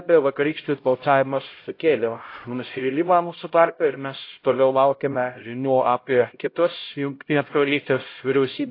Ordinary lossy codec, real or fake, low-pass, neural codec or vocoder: AAC, 32 kbps; fake; 5.4 kHz; codec, 16 kHz, 0.5 kbps, X-Codec, HuBERT features, trained on LibriSpeech